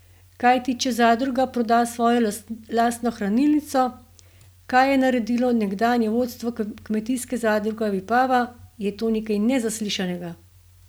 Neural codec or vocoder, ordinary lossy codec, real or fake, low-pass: none; none; real; none